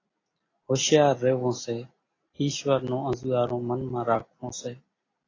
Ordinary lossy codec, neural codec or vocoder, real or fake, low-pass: AAC, 32 kbps; none; real; 7.2 kHz